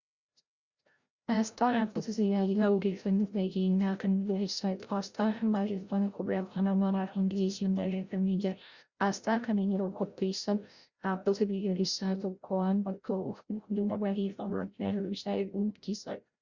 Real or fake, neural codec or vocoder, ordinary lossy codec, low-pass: fake; codec, 16 kHz, 0.5 kbps, FreqCodec, larger model; Opus, 64 kbps; 7.2 kHz